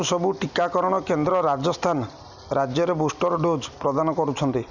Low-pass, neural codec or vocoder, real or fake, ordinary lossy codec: 7.2 kHz; none; real; none